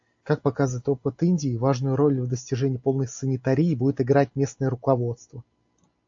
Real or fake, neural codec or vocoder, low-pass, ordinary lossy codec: real; none; 7.2 kHz; AAC, 64 kbps